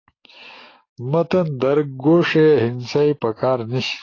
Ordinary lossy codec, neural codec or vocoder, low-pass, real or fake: AAC, 32 kbps; codec, 44.1 kHz, 7.8 kbps, DAC; 7.2 kHz; fake